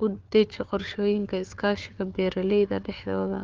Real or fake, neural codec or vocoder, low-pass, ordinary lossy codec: fake; codec, 16 kHz, 4 kbps, FunCodec, trained on Chinese and English, 50 frames a second; 7.2 kHz; Opus, 32 kbps